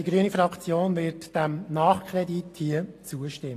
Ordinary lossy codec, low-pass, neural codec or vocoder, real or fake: AAC, 48 kbps; 14.4 kHz; none; real